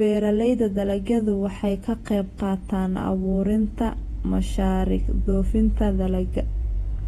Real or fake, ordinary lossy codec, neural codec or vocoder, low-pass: fake; AAC, 32 kbps; vocoder, 48 kHz, 128 mel bands, Vocos; 19.8 kHz